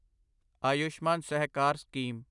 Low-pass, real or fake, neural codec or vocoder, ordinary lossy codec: 10.8 kHz; real; none; none